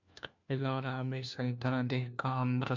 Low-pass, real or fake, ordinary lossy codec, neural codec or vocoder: 7.2 kHz; fake; MP3, 64 kbps; codec, 16 kHz, 1 kbps, FunCodec, trained on LibriTTS, 50 frames a second